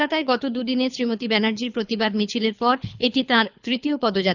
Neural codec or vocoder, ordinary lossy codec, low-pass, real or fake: codec, 24 kHz, 6 kbps, HILCodec; none; 7.2 kHz; fake